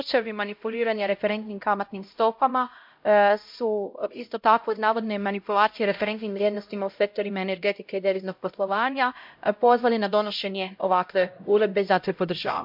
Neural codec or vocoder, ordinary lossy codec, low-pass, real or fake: codec, 16 kHz, 0.5 kbps, X-Codec, HuBERT features, trained on LibriSpeech; MP3, 48 kbps; 5.4 kHz; fake